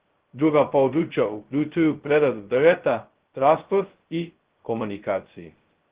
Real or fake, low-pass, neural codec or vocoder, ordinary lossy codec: fake; 3.6 kHz; codec, 16 kHz, 0.2 kbps, FocalCodec; Opus, 16 kbps